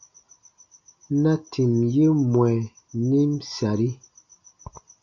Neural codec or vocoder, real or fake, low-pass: none; real; 7.2 kHz